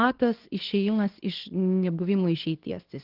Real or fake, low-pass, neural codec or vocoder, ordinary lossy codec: fake; 5.4 kHz; codec, 24 kHz, 0.9 kbps, WavTokenizer, medium speech release version 2; Opus, 16 kbps